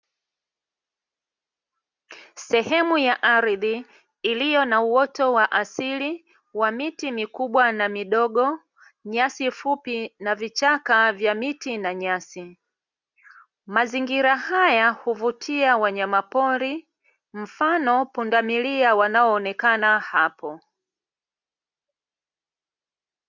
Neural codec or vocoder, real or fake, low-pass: none; real; 7.2 kHz